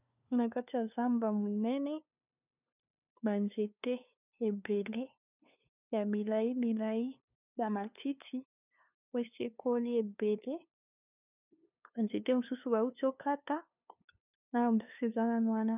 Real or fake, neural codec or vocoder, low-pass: fake; codec, 16 kHz, 2 kbps, FunCodec, trained on LibriTTS, 25 frames a second; 3.6 kHz